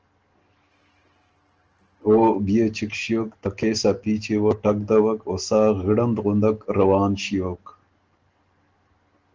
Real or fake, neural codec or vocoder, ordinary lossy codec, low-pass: real; none; Opus, 16 kbps; 7.2 kHz